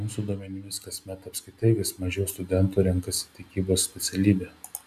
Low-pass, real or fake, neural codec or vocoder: 14.4 kHz; real; none